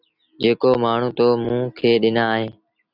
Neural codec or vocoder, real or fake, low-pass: none; real; 5.4 kHz